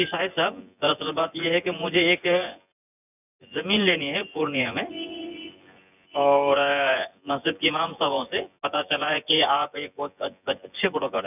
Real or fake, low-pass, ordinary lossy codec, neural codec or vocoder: fake; 3.6 kHz; none; vocoder, 24 kHz, 100 mel bands, Vocos